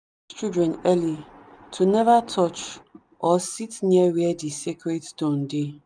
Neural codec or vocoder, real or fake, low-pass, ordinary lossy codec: none; real; 9.9 kHz; none